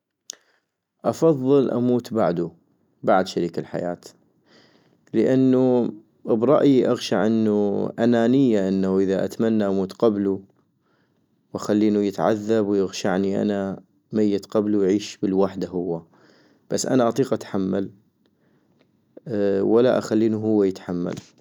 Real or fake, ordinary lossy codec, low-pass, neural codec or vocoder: real; none; 19.8 kHz; none